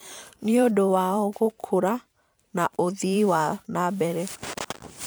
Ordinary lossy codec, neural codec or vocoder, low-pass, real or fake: none; vocoder, 44.1 kHz, 128 mel bands every 512 samples, BigVGAN v2; none; fake